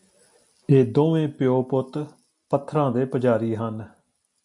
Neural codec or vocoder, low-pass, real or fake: none; 10.8 kHz; real